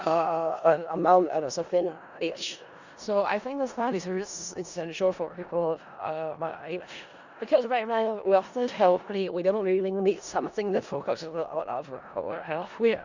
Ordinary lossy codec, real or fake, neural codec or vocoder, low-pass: Opus, 64 kbps; fake; codec, 16 kHz in and 24 kHz out, 0.4 kbps, LongCat-Audio-Codec, four codebook decoder; 7.2 kHz